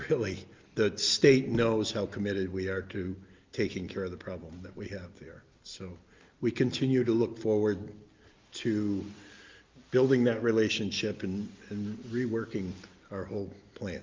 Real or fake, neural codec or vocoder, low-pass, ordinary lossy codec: real; none; 7.2 kHz; Opus, 24 kbps